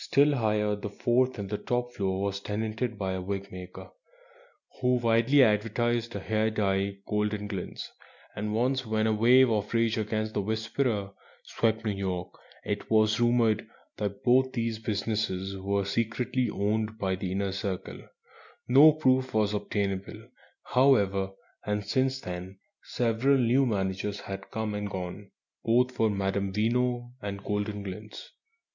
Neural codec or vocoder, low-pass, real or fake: none; 7.2 kHz; real